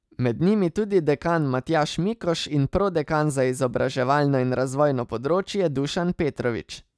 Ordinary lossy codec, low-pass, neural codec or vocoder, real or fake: none; none; none; real